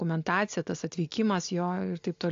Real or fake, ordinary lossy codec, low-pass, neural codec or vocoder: real; AAC, 48 kbps; 7.2 kHz; none